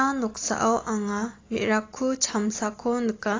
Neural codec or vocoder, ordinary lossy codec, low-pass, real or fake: none; AAC, 32 kbps; 7.2 kHz; real